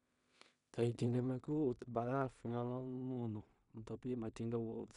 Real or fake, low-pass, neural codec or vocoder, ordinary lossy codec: fake; 10.8 kHz; codec, 16 kHz in and 24 kHz out, 0.4 kbps, LongCat-Audio-Codec, two codebook decoder; none